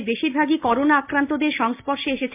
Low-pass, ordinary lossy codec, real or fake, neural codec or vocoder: 3.6 kHz; MP3, 32 kbps; real; none